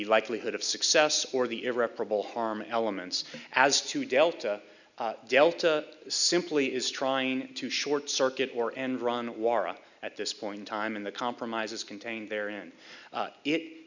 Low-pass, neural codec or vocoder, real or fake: 7.2 kHz; none; real